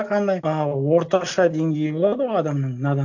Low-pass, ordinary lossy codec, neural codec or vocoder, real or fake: 7.2 kHz; none; none; real